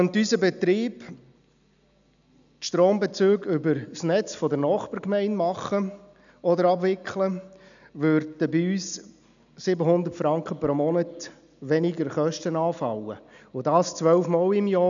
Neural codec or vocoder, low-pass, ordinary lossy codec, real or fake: none; 7.2 kHz; none; real